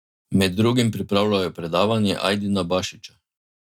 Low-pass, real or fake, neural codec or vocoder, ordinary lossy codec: 19.8 kHz; real; none; none